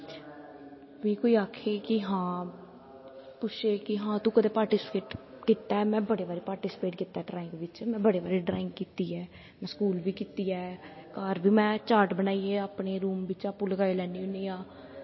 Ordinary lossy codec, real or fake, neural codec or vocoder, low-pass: MP3, 24 kbps; real; none; 7.2 kHz